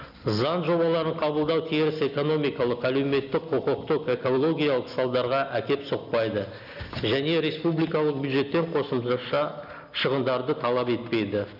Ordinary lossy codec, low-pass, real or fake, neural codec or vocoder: none; 5.4 kHz; real; none